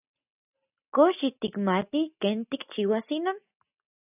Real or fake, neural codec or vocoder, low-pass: real; none; 3.6 kHz